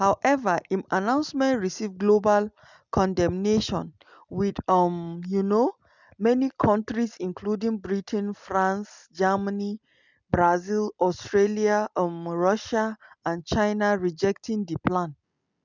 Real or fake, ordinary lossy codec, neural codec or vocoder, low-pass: real; none; none; 7.2 kHz